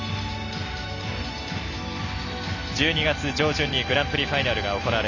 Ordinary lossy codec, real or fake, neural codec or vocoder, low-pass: none; real; none; 7.2 kHz